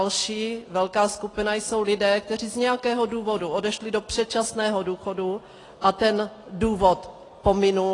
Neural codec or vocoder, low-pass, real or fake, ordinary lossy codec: none; 10.8 kHz; real; AAC, 32 kbps